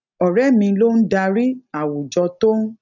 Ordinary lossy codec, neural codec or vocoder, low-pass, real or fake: none; none; 7.2 kHz; real